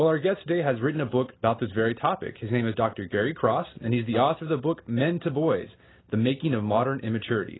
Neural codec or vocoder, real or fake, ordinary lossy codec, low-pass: none; real; AAC, 16 kbps; 7.2 kHz